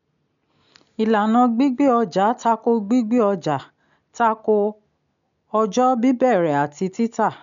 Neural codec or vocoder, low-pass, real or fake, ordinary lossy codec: none; 7.2 kHz; real; none